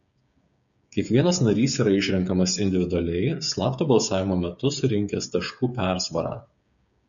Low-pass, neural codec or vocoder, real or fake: 7.2 kHz; codec, 16 kHz, 8 kbps, FreqCodec, smaller model; fake